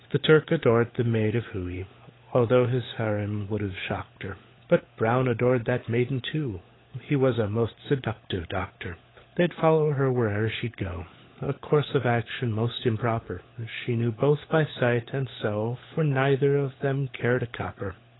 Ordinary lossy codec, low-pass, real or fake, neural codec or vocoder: AAC, 16 kbps; 7.2 kHz; fake; codec, 16 kHz, 16 kbps, FunCodec, trained on LibriTTS, 50 frames a second